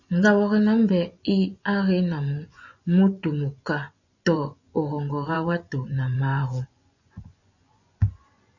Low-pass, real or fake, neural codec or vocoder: 7.2 kHz; fake; vocoder, 24 kHz, 100 mel bands, Vocos